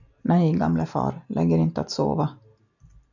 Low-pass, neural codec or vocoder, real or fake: 7.2 kHz; none; real